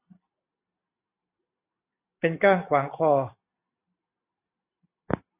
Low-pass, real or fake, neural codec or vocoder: 3.6 kHz; real; none